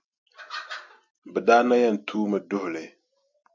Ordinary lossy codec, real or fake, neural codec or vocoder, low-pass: MP3, 64 kbps; real; none; 7.2 kHz